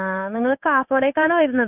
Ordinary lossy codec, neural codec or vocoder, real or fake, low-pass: none; codec, 16 kHz in and 24 kHz out, 1 kbps, XY-Tokenizer; fake; 3.6 kHz